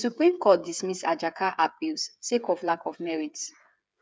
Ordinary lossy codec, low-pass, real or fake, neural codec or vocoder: none; none; fake; codec, 16 kHz, 8 kbps, FreqCodec, smaller model